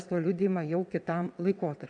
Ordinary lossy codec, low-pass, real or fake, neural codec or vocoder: MP3, 96 kbps; 9.9 kHz; fake; vocoder, 22.05 kHz, 80 mel bands, WaveNeXt